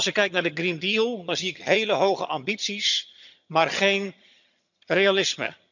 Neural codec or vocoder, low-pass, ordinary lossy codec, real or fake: vocoder, 22.05 kHz, 80 mel bands, HiFi-GAN; 7.2 kHz; none; fake